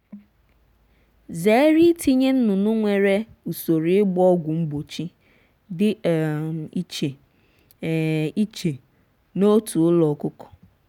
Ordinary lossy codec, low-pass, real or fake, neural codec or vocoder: none; 19.8 kHz; real; none